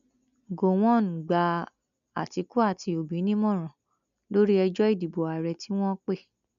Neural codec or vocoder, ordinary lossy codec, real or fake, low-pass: none; none; real; 7.2 kHz